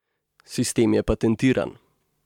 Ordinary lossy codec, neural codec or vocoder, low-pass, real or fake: MP3, 96 kbps; none; 19.8 kHz; real